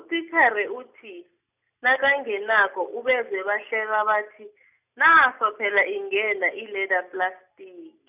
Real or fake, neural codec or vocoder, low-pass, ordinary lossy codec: real; none; 3.6 kHz; none